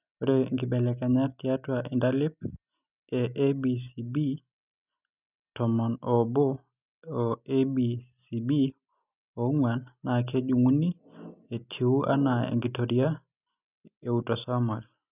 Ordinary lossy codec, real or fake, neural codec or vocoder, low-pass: none; real; none; 3.6 kHz